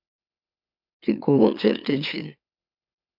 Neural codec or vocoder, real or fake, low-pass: autoencoder, 44.1 kHz, a latent of 192 numbers a frame, MeloTTS; fake; 5.4 kHz